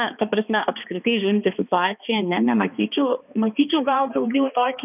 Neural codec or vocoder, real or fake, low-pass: codec, 16 kHz, 2 kbps, X-Codec, HuBERT features, trained on balanced general audio; fake; 3.6 kHz